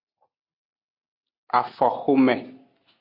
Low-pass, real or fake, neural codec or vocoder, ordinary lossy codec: 5.4 kHz; real; none; MP3, 48 kbps